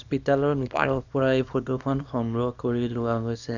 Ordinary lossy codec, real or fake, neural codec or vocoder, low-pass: none; fake; codec, 24 kHz, 0.9 kbps, WavTokenizer, small release; 7.2 kHz